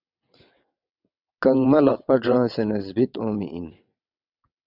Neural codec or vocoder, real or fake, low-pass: vocoder, 22.05 kHz, 80 mel bands, WaveNeXt; fake; 5.4 kHz